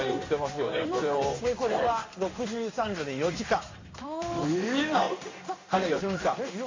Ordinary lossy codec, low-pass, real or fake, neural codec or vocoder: MP3, 64 kbps; 7.2 kHz; fake; codec, 16 kHz in and 24 kHz out, 1 kbps, XY-Tokenizer